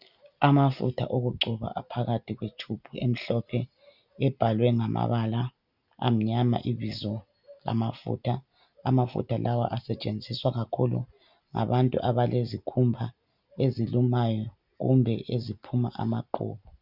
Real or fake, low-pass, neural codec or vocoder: real; 5.4 kHz; none